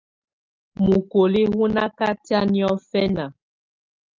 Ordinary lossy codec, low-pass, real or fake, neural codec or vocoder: Opus, 24 kbps; 7.2 kHz; real; none